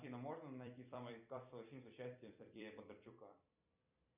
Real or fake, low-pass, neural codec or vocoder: fake; 3.6 kHz; vocoder, 44.1 kHz, 128 mel bands every 256 samples, BigVGAN v2